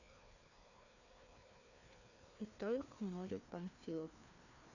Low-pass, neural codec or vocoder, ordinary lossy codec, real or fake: 7.2 kHz; codec, 16 kHz, 2 kbps, FreqCodec, larger model; none; fake